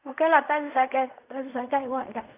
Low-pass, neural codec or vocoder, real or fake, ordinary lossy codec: 3.6 kHz; codec, 16 kHz in and 24 kHz out, 0.4 kbps, LongCat-Audio-Codec, fine tuned four codebook decoder; fake; none